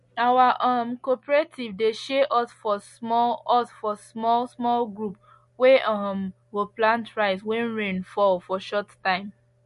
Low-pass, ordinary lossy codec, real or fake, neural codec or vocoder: 14.4 kHz; MP3, 48 kbps; real; none